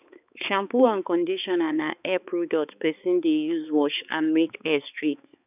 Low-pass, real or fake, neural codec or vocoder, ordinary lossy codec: 3.6 kHz; fake; codec, 16 kHz, 4 kbps, X-Codec, HuBERT features, trained on balanced general audio; none